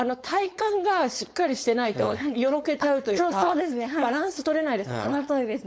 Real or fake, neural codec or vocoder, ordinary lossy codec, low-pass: fake; codec, 16 kHz, 4.8 kbps, FACodec; none; none